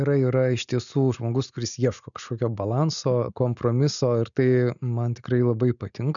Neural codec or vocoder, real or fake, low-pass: none; real; 7.2 kHz